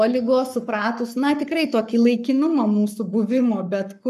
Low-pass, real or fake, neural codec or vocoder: 14.4 kHz; fake; codec, 44.1 kHz, 7.8 kbps, Pupu-Codec